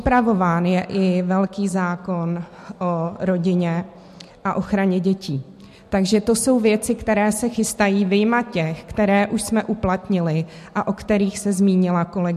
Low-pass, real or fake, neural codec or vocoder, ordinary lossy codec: 14.4 kHz; real; none; MP3, 64 kbps